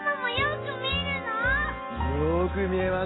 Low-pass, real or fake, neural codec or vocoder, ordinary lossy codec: 7.2 kHz; real; none; AAC, 16 kbps